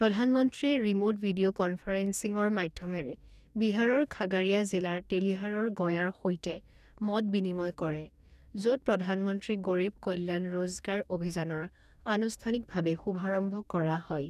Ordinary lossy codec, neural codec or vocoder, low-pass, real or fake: none; codec, 44.1 kHz, 2.6 kbps, DAC; 14.4 kHz; fake